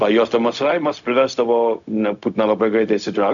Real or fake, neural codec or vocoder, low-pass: fake; codec, 16 kHz, 0.4 kbps, LongCat-Audio-Codec; 7.2 kHz